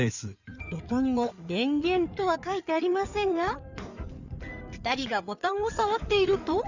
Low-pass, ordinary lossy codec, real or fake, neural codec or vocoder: 7.2 kHz; none; fake; codec, 16 kHz in and 24 kHz out, 2.2 kbps, FireRedTTS-2 codec